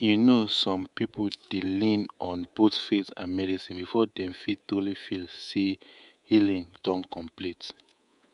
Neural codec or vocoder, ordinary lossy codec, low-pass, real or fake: codec, 24 kHz, 3.1 kbps, DualCodec; none; 10.8 kHz; fake